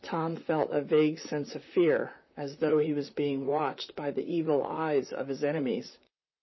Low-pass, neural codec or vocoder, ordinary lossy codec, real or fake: 7.2 kHz; vocoder, 44.1 kHz, 128 mel bands, Pupu-Vocoder; MP3, 24 kbps; fake